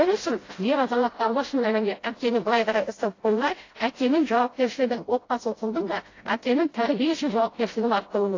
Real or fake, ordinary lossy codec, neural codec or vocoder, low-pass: fake; AAC, 32 kbps; codec, 16 kHz, 0.5 kbps, FreqCodec, smaller model; 7.2 kHz